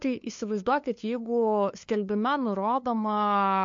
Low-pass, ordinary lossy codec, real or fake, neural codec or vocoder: 7.2 kHz; MP3, 48 kbps; fake; codec, 16 kHz, 2 kbps, FunCodec, trained on LibriTTS, 25 frames a second